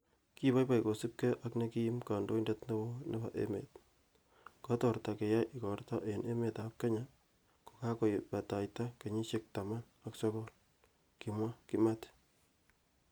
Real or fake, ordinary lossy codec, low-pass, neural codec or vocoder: real; none; none; none